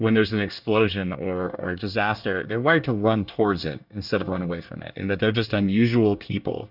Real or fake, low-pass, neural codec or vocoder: fake; 5.4 kHz; codec, 24 kHz, 1 kbps, SNAC